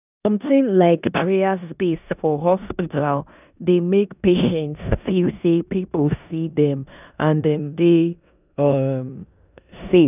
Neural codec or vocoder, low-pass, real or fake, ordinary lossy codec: codec, 16 kHz in and 24 kHz out, 0.9 kbps, LongCat-Audio-Codec, four codebook decoder; 3.6 kHz; fake; none